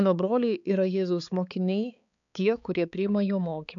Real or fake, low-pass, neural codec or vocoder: fake; 7.2 kHz; codec, 16 kHz, 4 kbps, X-Codec, HuBERT features, trained on balanced general audio